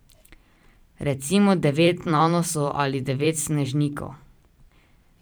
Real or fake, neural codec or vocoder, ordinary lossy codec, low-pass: fake; vocoder, 44.1 kHz, 128 mel bands every 512 samples, BigVGAN v2; none; none